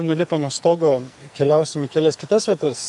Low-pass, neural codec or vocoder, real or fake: 10.8 kHz; codec, 44.1 kHz, 2.6 kbps, SNAC; fake